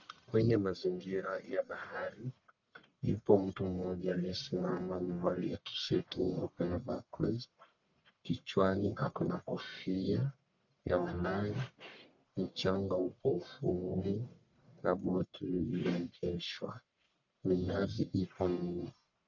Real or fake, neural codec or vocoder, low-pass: fake; codec, 44.1 kHz, 1.7 kbps, Pupu-Codec; 7.2 kHz